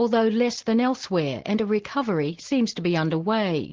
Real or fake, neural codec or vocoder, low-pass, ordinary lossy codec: real; none; 7.2 kHz; Opus, 16 kbps